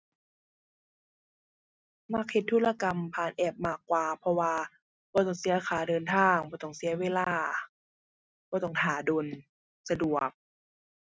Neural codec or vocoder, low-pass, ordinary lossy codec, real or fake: none; none; none; real